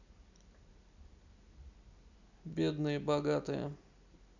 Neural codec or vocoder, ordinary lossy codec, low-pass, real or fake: none; none; 7.2 kHz; real